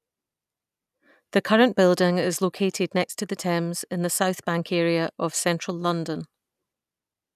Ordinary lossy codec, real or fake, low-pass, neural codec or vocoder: none; real; 14.4 kHz; none